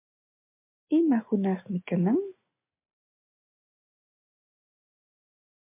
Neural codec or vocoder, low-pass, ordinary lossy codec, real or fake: none; 3.6 kHz; MP3, 32 kbps; real